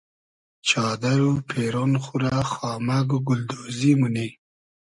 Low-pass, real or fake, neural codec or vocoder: 10.8 kHz; real; none